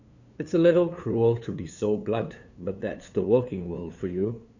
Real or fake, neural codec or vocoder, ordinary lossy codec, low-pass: fake; codec, 16 kHz, 2 kbps, FunCodec, trained on LibriTTS, 25 frames a second; none; 7.2 kHz